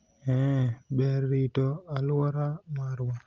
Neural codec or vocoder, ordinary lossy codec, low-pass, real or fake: none; Opus, 32 kbps; 7.2 kHz; real